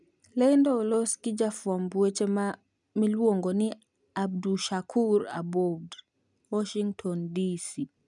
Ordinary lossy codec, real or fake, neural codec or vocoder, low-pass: none; real; none; 10.8 kHz